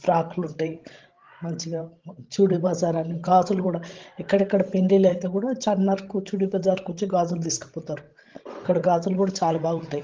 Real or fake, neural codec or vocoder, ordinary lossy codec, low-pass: fake; codec, 16 kHz, 16 kbps, FreqCodec, larger model; Opus, 16 kbps; 7.2 kHz